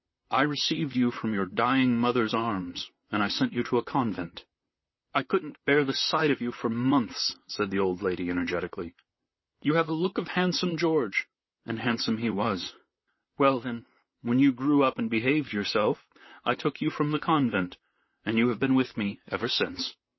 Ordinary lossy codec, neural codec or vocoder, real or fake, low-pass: MP3, 24 kbps; vocoder, 44.1 kHz, 128 mel bands, Pupu-Vocoder; fake; 7.2 kHz